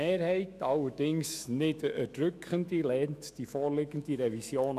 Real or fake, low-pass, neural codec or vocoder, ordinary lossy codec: fake; 14.4 kHz; autoencoder, 48 kHz, 128 numbers a frame, DAC-VAE, trained on Japanese speech; none